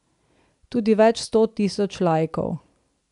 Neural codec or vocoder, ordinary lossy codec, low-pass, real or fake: none; none; 10.8 kHz; real